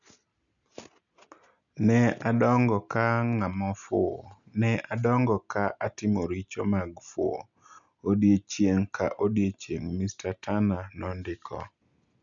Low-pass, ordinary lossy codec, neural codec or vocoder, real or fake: 7.2 kHz; none; none; real